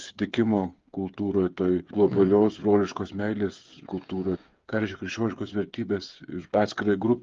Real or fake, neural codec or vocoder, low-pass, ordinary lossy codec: fake; codec, 16 kHz, 8 kbps, FreqCodec, smaller model; 7.2 kHz; Opus, 32 kbps